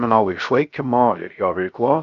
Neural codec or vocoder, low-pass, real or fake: codec, 16 kHz, 0.3 kbps, FocalCodec; 7.2 kHz; fake